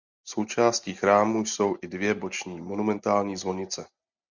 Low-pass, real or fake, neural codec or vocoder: 7.2 kHz; real; none